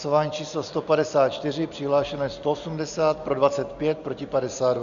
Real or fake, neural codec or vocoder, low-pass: real; none; 7.2 kHz